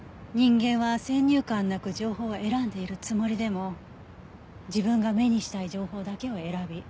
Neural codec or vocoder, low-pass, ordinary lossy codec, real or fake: none; none; none; real